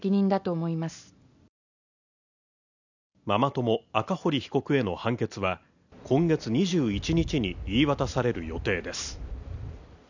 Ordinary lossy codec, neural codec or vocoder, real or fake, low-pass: none; none; real; 7.2 kHz